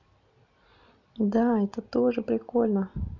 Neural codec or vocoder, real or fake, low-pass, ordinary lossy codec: none; real; 7.2 kHz; none